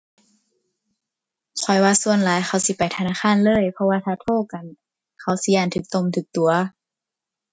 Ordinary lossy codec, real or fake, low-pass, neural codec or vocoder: none; real; none; none